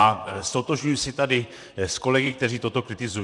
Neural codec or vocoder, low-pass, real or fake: vocoder, 44.1 kHz, 128 mel bands, Pupu-Vocoder; 10.8 kHz; fake